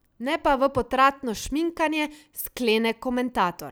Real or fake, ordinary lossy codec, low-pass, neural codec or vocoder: real; none; none; none